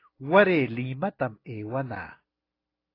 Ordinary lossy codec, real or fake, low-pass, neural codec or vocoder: AAC, 24 kbps; real; 5.4 kHz; none